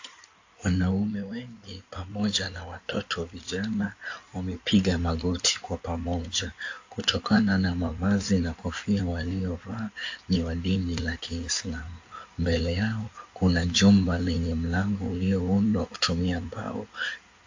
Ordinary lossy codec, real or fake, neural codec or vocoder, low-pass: AAC, 48 kbps; fake; codec, 16 kHz in and 24 kHz out, 2.2 kbps, FireRedTTS-2 codec; 7.2 kHz